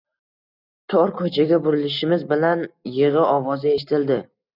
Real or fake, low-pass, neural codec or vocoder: real; 5.4 kHz; none